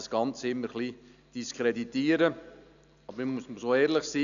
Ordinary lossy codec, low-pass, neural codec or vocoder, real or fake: none; 7.2 kHz; none; real